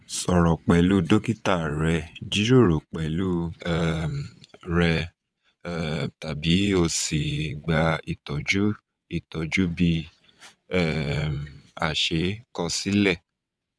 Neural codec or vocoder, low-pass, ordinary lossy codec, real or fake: vocoder, 22.05 kHz, 80 mel bands, WaveNeXt; none; none; fake